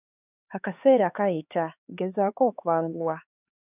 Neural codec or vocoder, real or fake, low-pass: codec, 16 kHz, 4 kbps, X-Codec, HuBERT features, trained on LibriSpeech; fake; 3.6 kHz